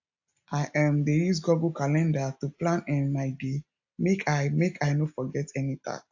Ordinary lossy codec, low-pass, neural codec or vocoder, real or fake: AAC, 48 kbps; 7.2 kHz; none; real